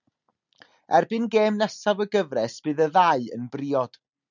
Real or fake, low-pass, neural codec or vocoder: real; 7.2 kHz; none